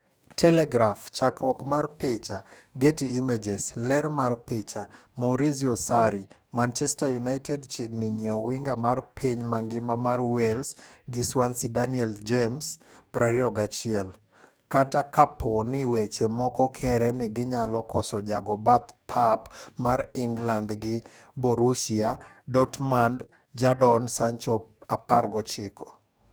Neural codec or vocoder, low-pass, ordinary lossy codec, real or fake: codec, 44.1 kHz, 2.6 kbps, DAC; none; none; fake